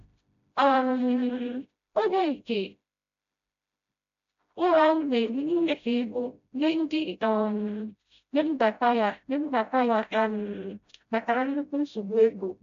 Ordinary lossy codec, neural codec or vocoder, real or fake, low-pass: MP3, 64 kbps; codec, 16 kHz, 0.5 kbps, FreqCodec, smaller model; fake; 7.2 kHz